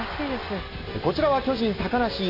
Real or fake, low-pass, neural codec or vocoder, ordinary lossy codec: real; 5.4 kHz; none; AAC, 32 kbps